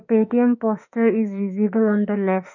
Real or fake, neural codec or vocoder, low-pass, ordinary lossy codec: fake; autoencoder, 48 kHz, 32 numbers a frame, DAC-VAE, trained on Japanese speech; 7.2 kHz; none